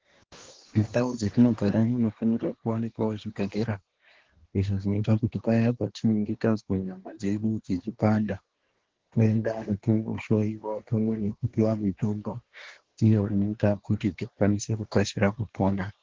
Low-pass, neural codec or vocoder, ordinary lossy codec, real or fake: 7.2 kHz; codec, 24 kHz, 1 kbps, SNAC; Opus, 16 kbps; fake